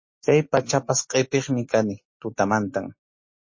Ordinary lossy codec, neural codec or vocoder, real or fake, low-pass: MP3, 32 kbps; none; real; 7.2 kHz